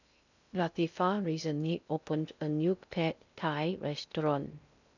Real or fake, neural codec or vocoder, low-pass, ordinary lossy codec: fake; codec, 16 kHz in and 24 kHz out, 0.6 kbps, FocalCodec, streaming, 2048 codes; 7.2 kHz; none